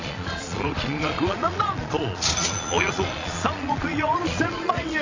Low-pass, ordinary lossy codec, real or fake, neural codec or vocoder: 7.2 kHz; AAC, 32 kbps; fake; vocoder, 22.05 kHz, 80 mel bands, Vocos